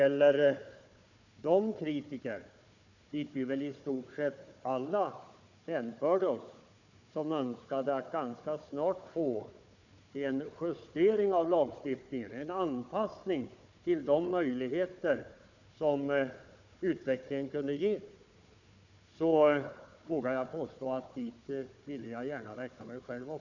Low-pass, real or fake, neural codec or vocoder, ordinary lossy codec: 7.2 kHz; fake; codec, 16 kHz, 4 kbps, FunCodec, trained on Chinese and English, 50 frames a second; none